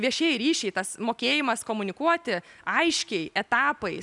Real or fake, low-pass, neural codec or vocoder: real; 10.8 kHz; none